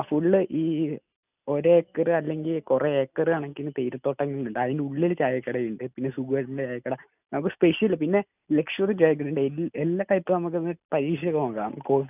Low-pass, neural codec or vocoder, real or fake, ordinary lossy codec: 3.6 kHz; none; real; none